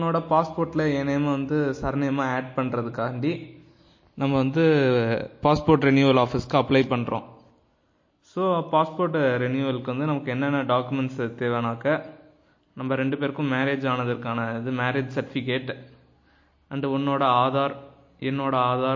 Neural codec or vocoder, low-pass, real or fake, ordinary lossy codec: none; 7.2 kHz; real; MP3, 32 kbps